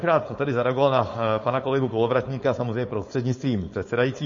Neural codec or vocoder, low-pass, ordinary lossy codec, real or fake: codec, 16 kHz, 4.8 kbps, FACodec; 7.2 kHz; MP3, 32 kbps; fake